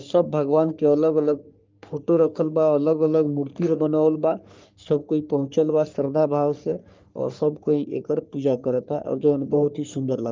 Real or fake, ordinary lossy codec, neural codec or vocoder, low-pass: fake; Opus, 24 kbps; codec, 44.1 kHz, 3.4 kbps, Pupu-Codec; 7.2 kHz